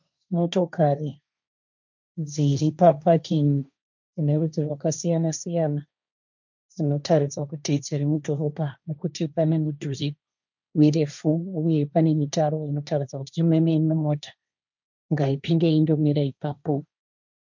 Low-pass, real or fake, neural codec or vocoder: 7.2 kHz; fake; codec, 16 kHz, 1.1 kbps, Voila-Tokenizer